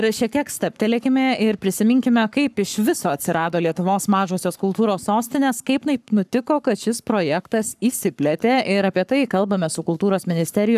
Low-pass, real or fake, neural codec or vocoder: 14.4 kHz; fake; codec, 44.1 kHz, 7.8 kbps, Pupu-Codec